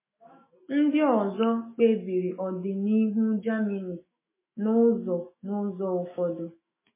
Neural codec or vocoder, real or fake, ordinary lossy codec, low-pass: autoencoder, 48 kHz, 128 numbers a frame, DAC-VAE, trained on Japanese speech; fake; MP3, 16 kbps; 3.6 kHz